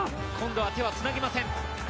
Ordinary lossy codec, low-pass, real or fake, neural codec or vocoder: none; none; real; none